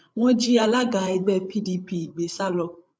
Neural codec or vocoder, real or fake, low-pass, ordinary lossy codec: codec, 16 kHz, 8 kbps, FreqCodec, larger model; fake; none; none